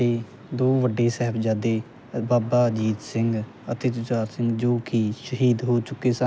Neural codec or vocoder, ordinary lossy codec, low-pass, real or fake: none; none; none; real